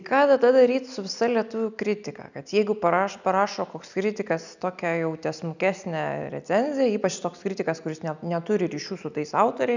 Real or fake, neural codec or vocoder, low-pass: real; none; 7.2 kHz